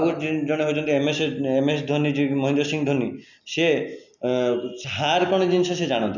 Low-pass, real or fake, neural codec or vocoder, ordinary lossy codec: 7.2 kHz; real; none; none